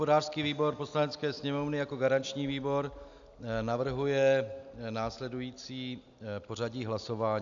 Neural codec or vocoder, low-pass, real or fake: none; 7.2 kHz; real